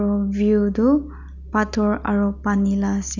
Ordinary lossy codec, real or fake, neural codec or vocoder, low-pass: none; real; none; 7.2 kHz